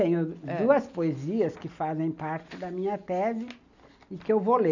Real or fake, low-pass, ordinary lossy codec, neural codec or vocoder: real; 7.2 kHz; none; none